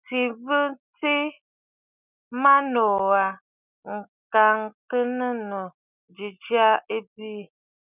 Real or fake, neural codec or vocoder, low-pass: real; none; 3.6 kHz